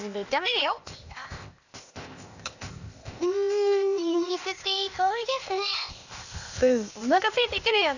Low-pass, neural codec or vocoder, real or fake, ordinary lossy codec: 7.2 kHz; codec, 16 kHz, 0.8 kbps, ZipCodec; fake; none